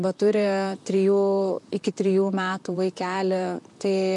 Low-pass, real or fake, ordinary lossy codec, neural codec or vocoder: 10.8 kHz; fake; MP3, 64 kbps; vocoder, 44.1 kHz, 128 mel bands, Pupu-Vocoder